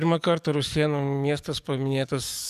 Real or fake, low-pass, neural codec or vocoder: fake; 14.4 kHz; codec, 44.1 kHz, 7.8 kbps, DAC